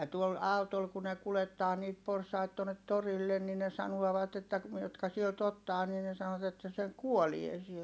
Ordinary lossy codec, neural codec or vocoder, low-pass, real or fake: none; none; none; real